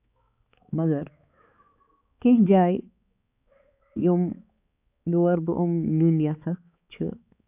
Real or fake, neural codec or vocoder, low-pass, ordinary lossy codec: fake; codec, 16 kHz, 4 kbps, X-Codec, HuBERT features, trained on balanced general audio; 3.6 kHz; AAC, 32 kbps